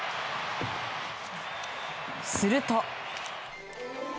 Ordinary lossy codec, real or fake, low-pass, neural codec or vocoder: none; real; none; none